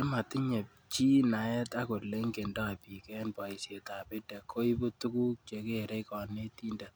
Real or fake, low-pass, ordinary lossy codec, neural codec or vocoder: real; none; none; none